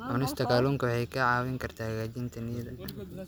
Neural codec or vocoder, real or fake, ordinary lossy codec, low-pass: none; real; none; none